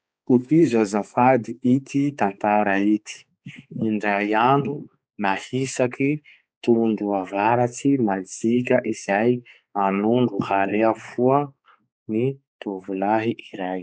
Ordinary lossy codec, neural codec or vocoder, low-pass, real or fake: none; codec, 16 kHz, 4 kbps, X-Codec, HuBERT features, trained on balanced general audio; none; fake